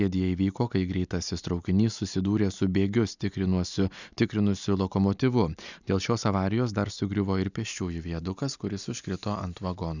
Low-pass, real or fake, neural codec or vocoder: 7.2 kHz; real; none